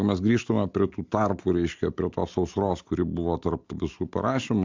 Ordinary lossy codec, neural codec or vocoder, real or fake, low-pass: MP3, 64 kbps; none; real; 7.2 kHz